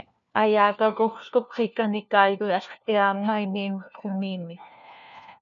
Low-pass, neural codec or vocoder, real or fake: 7.2 kHz; codec, 16 kHz, 1 kbps, FunCodec, trained on LibriTTS, 50 frames a second; fake